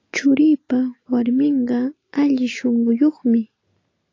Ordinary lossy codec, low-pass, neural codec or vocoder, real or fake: AAC, 32 kbps; 7.2 kHz; none; real